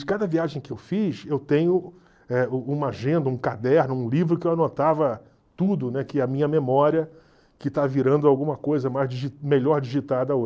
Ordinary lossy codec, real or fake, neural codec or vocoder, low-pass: none; real; none; none